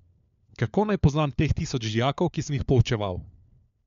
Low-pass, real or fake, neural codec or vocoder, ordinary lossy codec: 7.2 kHz; fake; codec, 16 kHz, 4 kbps, FunCodec, trained on LibriTTS, 50 frames a second; MP3, 64 kbps